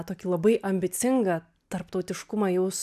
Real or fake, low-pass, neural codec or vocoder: real; 14.4 kHz; none